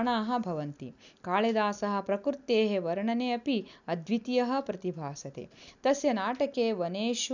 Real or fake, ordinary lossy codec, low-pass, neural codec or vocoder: real; none; 7.2 kHz; none